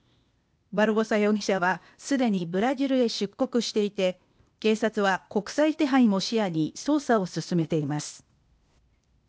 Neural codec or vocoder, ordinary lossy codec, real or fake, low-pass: codec, 16 kHz, 0.8 kbps, ZipCodec; none; fake; none